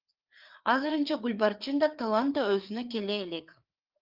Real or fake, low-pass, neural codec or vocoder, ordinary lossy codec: fake; 5.4 kHz; codec, 16 kHz, 4 kbps, FreqCodec, larger model; Opus, 24 kbps